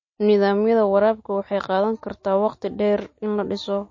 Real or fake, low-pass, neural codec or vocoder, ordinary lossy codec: real; 7.2 kHz; none; MP3, 32 kbps